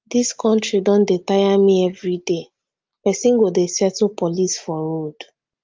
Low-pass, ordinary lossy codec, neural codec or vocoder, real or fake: 7.2 kHz; Opus, 32 kbps; none; real